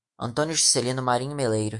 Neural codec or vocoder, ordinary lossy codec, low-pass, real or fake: autoencoder, 48 kHz, 128 numbers a frame, DAC-VAE, trained on Japanese speech; MP3, 64 kbps; 10.8 kHz; fake